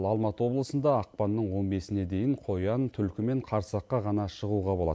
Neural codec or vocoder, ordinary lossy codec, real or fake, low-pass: none; none; real; none